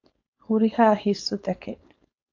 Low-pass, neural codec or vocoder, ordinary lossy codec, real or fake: 7.2 kHz; codec, 16 kHz, 4.8 kbps, FACodec; AAC, 48 kbps; fake